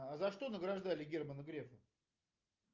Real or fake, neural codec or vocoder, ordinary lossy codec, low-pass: real; none; Opus, 24 kbps; 7.2 kHz